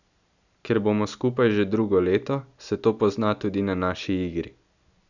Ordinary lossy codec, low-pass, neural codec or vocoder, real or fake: none; 7.2 kHz; none; real